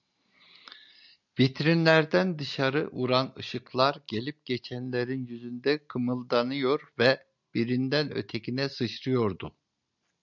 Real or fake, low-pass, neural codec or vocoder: real; 7.2 kHz; none